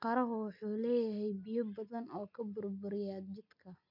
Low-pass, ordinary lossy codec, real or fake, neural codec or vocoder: 5.4 kHz; MP3, 48 kbps; real; none